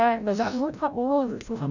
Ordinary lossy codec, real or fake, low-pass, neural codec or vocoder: none; fake; 7.2 kHz; codec, 16 kHz, 0.5 kbps, FreqCodec, larger model